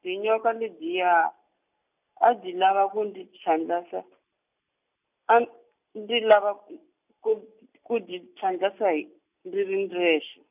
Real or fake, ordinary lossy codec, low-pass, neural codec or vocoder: real; none; 3.6 kHz; none